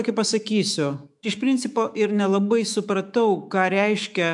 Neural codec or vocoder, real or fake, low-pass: autoencoder, 48 kHz, 128 numbers a frame, DAC-VAE, trained on Japanese speech; fake; 10.8 kHz